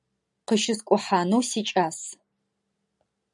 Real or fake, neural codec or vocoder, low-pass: real; none; 10.8 kHz